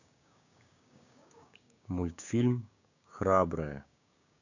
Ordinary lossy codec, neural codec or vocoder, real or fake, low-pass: none; codec, 16 kHz, 6 kbps, DAC; fake; 7.2 kHz